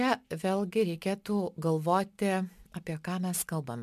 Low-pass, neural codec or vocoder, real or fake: 14.4 kHz; none; real